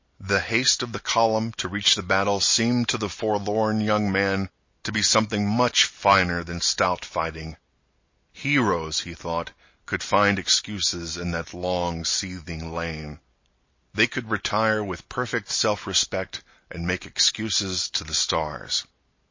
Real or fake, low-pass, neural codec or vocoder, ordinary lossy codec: real; 7.2 kHz; none; MP3, 32 kbps